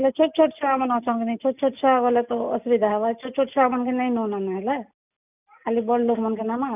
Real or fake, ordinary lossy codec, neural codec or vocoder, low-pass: real; none; none; 3.6 kHz